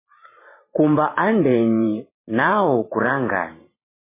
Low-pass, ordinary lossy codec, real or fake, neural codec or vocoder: 3.6 kHz; MP3, 16 kbps; fake; vocoder, 44.1 kHz, 128 mel bands every 512 samples, BigVGAN v2